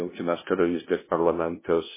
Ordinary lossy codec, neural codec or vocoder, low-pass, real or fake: MP3, 16 kbps; codec, 16 kHz, 0.5 kbps, FunCodec, trained on LibriTTS, 25 frames a second; 3.6 kHz; fake